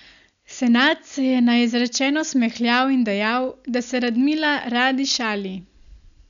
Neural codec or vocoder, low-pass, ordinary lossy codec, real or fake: none; 7.2 kHz; none; real